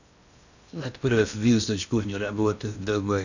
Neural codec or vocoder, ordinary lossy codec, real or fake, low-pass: codec, 16 kHz in and 24 kHz out, 0.6 kbps, FocalCodec, streaming, 2048 codes; none; fake; 7.2 kHz